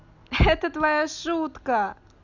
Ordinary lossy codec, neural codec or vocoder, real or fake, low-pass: none; none; real; 7.2 kHz